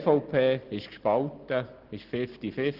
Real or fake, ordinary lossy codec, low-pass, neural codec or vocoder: real; Opus, 16 kbps; 5.4 kHz; none